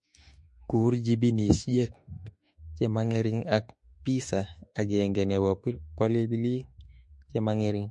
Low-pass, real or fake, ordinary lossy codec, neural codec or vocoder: 10.8 kHz; fake; MP3, 48 kbps; autoencoder, 48 kHz, 32 numbers a frame, DAC-VAE, trained on Japanese speech